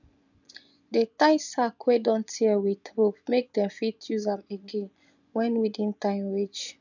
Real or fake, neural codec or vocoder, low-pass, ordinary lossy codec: fake; vocoder, 24 kHz, 100 mel bands, Vocos; 7.2 kHz; none